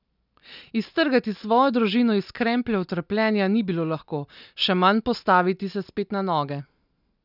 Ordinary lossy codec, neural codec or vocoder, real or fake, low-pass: none; none; real; 5.4 kHz